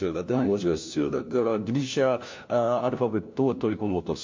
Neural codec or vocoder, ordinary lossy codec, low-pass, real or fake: codec, 16 kHz, 1 kbps, FunCodec, trained on LibriTTS, 50 frames a second; MP3, 48 kbps; 7.2 kHz; fake